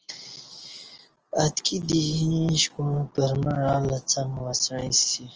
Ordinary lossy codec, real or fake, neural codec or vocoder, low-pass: Opus, 24 kbps; real; none; 7.2 kHz